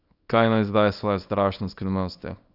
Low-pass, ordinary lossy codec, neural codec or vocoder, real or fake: 5.4 kHz; none; codec, 24 kHz, 0.9 kbps, WavTokenizer, small release; fake